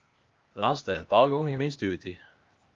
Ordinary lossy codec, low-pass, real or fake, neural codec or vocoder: Opus, 32 kbps; 7.2 kHz; fake; codec, 16 kHz, 0.8 kbps, ZipCodec